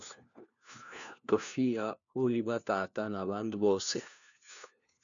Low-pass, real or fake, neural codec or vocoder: 7.2 kHz; fake; codec, 16 kHz, 1 kbps, FunCodec, trained on LibriTTS, 50 frames a second